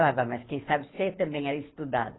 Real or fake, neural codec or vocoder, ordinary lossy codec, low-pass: fake; codec, 24 kHz, 6 kbps, HILCodec; AAC, 16 kbps; 7.2 kHz